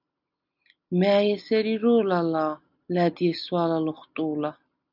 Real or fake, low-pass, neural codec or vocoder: real; 5.4 kHz; none